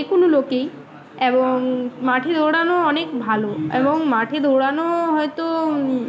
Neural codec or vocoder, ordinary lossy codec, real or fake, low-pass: none; none; real; none